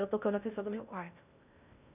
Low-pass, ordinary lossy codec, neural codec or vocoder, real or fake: 3.6 kHz; none; codec, 16 kHz in and 24 kHz out, 0.6 kbps, FocalCodec, streaming, 2048 codes; fake